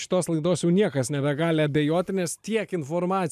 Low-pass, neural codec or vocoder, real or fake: 14.4 kHz; none; real